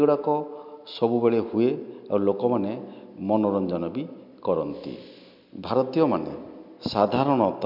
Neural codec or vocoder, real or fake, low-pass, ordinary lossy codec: none; real; 5.4 kHz; MP3, 48 kbps